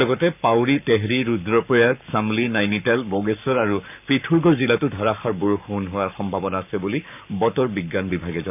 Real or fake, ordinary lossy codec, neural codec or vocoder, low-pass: fake; none; codec, 44.1 kHz, 7.8 kbps, Pupu-Codec; 3.6 kHz